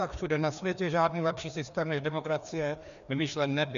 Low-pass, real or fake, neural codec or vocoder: 7.2 kHz; fake; codec, 16 kHz, 2 kbps, FreqCodec, larger model